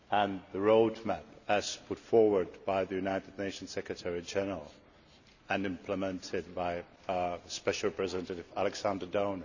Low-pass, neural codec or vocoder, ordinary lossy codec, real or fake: 7.2 kHz; none; none; real